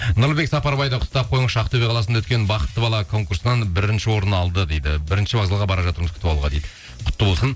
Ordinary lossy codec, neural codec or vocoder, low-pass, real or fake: none; none; none; real